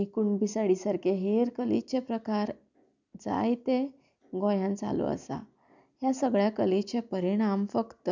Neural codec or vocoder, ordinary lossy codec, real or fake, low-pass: none; none; real; 7.2 kHz